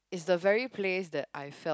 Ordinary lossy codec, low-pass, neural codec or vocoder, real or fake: none; none; none; real